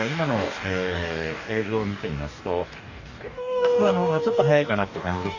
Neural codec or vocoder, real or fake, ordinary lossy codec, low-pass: codec, 44.1 kHz, 2.6 kbps, DAC; fake; none; 7.2 kHz